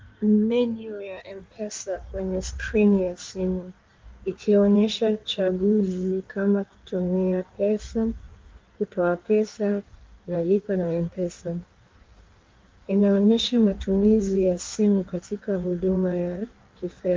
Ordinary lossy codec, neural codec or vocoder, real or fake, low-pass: Opus, 32 kbps; codec, 16 kHz in and 24 kHz out, 1.1 kbps, FireRedTTS-2 codec; fake; 7.2 kHz